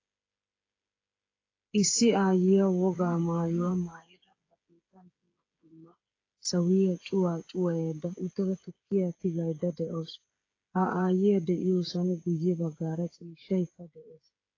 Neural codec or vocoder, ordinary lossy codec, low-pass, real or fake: codec, 16 kHz, 8 kbps, FreqCodec, smaller model; AAC, 32 kbps; 7.2 kHz; fake